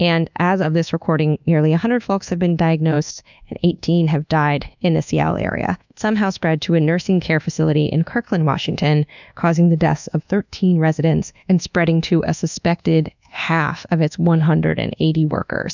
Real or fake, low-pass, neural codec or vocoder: fake; 7.2 kHz; codec, 24 kHz, 1.2 kbps, DualCodec